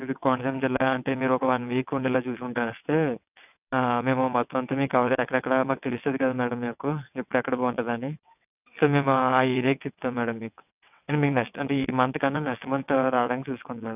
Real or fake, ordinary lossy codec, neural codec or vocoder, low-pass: fake; none; vocoder, 22.05 kHz, 80 mel bands, WaveNeXt; 3.6 kHz